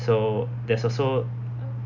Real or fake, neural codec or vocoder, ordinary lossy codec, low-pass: real; none; none; 7.2 kHz